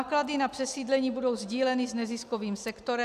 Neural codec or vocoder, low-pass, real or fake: none; 14.4 kHz; real